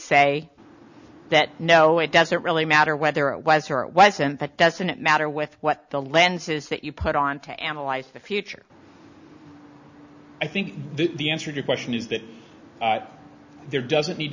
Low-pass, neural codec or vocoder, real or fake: 7.2 kHz; none; real